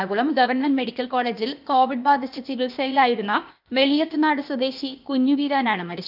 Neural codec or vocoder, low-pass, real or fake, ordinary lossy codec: codec, 16 kHz, 0.8 kbps, ZipCodec; 5.4 kHz; fake; none